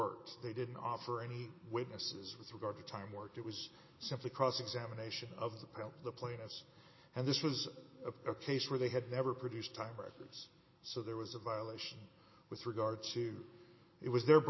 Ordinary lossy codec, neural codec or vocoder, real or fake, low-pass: MP3, 24 kbps; none; real; 7.2 kHz